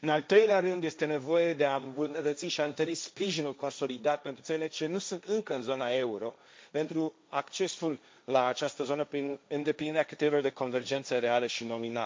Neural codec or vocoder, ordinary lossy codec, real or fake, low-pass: codec, 16 kHz, 1.1 kbps, Voila-Tokenizer; none; fake; none